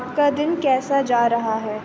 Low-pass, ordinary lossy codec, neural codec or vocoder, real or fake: none; none; none; real